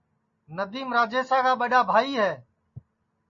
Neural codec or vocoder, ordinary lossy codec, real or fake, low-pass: none; MP3, 32 kbps; real; 7.2 kHz